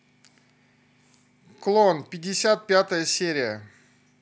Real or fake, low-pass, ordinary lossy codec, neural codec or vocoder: real; none; none; none